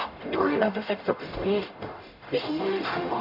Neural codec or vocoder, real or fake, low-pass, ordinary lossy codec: codec, 44.1 kHz, 0.9 kbps, DAC; fake; 5.4 kHz; AAC, 48 kbps